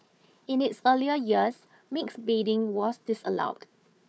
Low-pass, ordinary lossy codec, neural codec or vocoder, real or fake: none; none; codec, 16 kHz, 4 kbps, FunCodec, trained on Chinese and English, 50 frames a second; fake